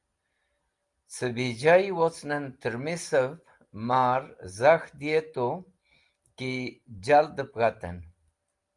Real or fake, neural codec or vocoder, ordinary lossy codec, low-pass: real; none; Opus, 32 kbps; 10.8 kHz